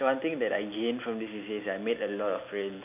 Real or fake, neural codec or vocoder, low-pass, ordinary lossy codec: real; none; 3.6 kHz; none